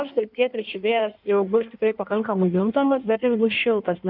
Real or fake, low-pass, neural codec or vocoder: fake; 5.4 kHz; codec, 16 kHz in and 24 kHz out, 1.1 kbps, FireRedTTS-2 codec